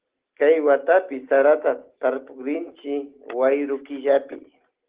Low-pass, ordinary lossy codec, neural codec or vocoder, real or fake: 3.6 kHz; Opus, 16 kbps; none; real